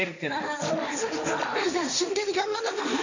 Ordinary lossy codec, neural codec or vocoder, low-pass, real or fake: none; codec, 16 kHz, 1.1 kbps, Voila-Tokenizer; 7.2 kHz; fake